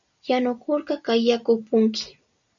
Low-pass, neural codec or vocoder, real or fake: 7.2 kHz; none; real